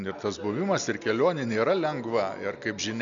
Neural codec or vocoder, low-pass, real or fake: none; 7.2 kHz; real